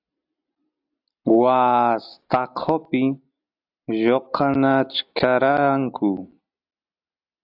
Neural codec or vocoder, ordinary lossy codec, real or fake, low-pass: none; AAC, 48 kbps; real; 5.4 kHz